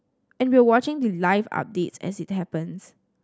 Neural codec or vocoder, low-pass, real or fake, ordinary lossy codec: none; none; real; none